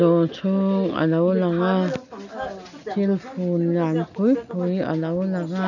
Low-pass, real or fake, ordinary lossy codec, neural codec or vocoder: 7.2 kHz; real; none; none